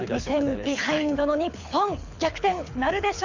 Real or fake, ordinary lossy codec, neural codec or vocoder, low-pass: fake; Opus, 64 kbps; codec, 24 kHz, 6 kbps, HILCodec; 7.2 kHz